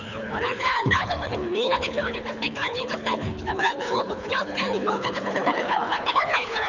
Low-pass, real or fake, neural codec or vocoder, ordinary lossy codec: 7.2 kHz; fake; codec, 24 kHz, 3 kbps, HILCodec; none